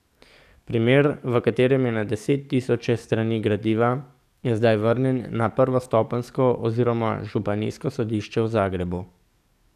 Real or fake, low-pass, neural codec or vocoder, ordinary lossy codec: fake; 14.4 kHz; codec, 44.1 kHz, 7.8 kbps, DAC; none